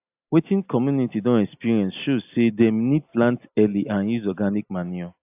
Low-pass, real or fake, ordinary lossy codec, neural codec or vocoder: 3.6 kHz; real; AAC, 32 kbps; none